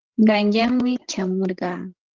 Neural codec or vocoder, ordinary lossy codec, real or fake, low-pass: codec, 16 kHz, 16 kbps, FreqCodec, larger model; Opus, 16 kbps; fake; 7.2 kHz